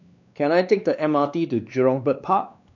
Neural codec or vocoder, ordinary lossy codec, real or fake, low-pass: codec, 16 kHz, 2 kbps, X-Codec, WavLM features, trained on Multilingual LibriSpeech; none; fake; 7.2 kHz